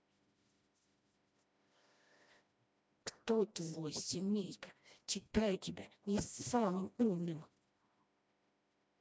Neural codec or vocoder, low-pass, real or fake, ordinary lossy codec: codec, 16 kHz, 1 kbps, FreqCodec, smaller model; none; fake; none